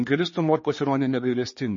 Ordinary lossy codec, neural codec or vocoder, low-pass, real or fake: MP3, 32 kbps; codec, 16 kHz, 4 kbps, X-Codec, HuBERT features, trained on general audio; 7.2 kHz; fake